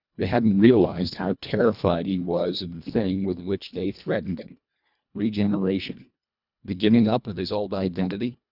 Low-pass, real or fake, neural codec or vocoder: 5.4 kHz; fake; codec, 24 kHz, 1.5 kbps, HILCodec